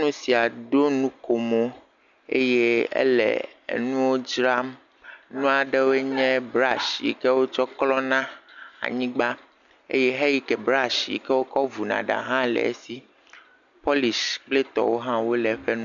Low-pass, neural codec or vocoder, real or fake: 7.2 kHz; none; real